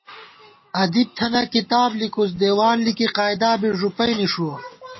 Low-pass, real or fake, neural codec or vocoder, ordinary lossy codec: 7.2 kHz; real; none; MP3, 24 kbps